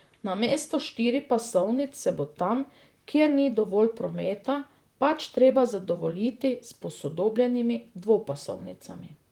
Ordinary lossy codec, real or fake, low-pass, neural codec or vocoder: Opus, 24 kbps; fake; 19.8 kHz; vocoder, 44.1 kHz, 128 mel bands, Pupu-Vocoder